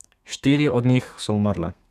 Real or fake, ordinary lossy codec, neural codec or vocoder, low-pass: fake; none; codec, 32 kHz, 1.9 kbps, SNAC; 14.4 kHz